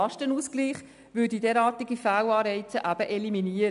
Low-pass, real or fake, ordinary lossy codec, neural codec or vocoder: 10.8 kHz; real; MP3, 96 kbps; none